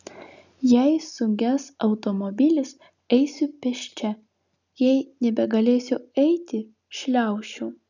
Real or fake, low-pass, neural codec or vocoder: real; 7.2 kHz; none